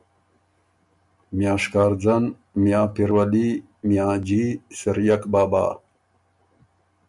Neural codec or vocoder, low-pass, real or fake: none; 10.8 kHz; real